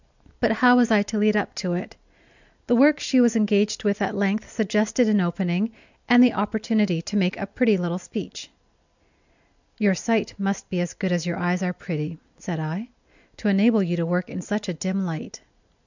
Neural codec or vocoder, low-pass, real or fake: none; 7.2 kHz; real